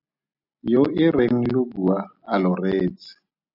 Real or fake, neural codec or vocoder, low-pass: real; none; 5.4 kHz